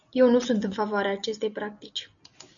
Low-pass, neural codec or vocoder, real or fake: 7.2 kHz; none; real